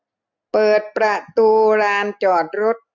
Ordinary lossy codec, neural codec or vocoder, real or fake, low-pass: none; none; real; 7.2 kHz